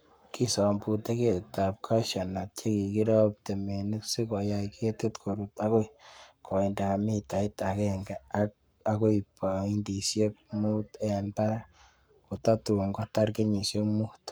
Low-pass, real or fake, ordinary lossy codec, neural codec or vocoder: none; fake; none; codec, 44.1 kHz, 7.8 kbps, Pupu-Codec